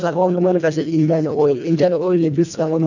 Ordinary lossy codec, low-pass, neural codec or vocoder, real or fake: none; 7.2 kHz; codec, 24 kHz, 1.5 kbps, HILCodec; fake